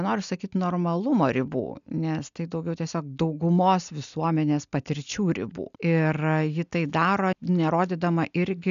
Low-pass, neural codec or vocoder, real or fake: 7.2 kHz; none; real